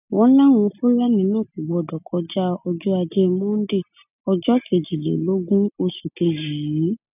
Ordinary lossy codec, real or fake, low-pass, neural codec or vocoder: none; real; 3.6 kHz; none